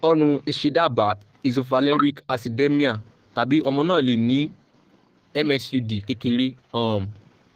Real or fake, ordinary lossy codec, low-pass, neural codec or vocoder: fake; Opus, 24 kbps; 14.4 kHz; codec, 32 kHz, 1.9 kbps, SNAC